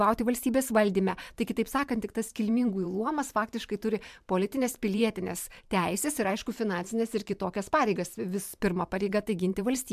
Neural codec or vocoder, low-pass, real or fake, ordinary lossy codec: vocoder, 44.1 kHz, 128 mel bands every 512 samples, BigVGAN v2; 14.4 kHz; fake; MP3, 96 kbps